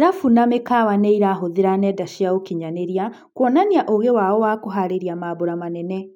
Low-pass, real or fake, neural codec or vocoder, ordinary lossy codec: 19.8 kHz; real; none; none